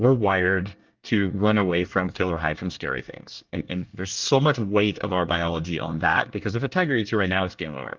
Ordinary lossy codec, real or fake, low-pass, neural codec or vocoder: Opus, 32 kbps; fake; 7.2 kHz; codec, 24 kHz, 1 kbps, SNAC